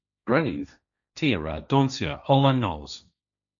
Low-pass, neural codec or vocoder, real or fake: 7.2 kHz; codec, 16 kHz, 1.1 kbps, Voila-Tokenizer; fake